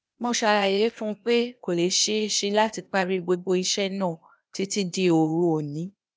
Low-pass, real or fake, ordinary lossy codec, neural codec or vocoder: none; fake; none; codec, 16 kHz, 0.8 kbps, ZipCodec